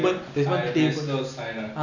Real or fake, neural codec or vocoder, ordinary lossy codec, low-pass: real; none; none; 7.2 kHz